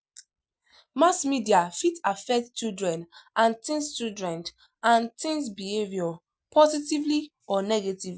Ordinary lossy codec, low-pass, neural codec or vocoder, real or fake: none; none; none; real